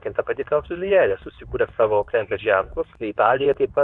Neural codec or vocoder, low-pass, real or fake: codec, 24 kHz, 0.9 kbps, WavTokenizer, medium speech release version 2; 10.8 kHz; fake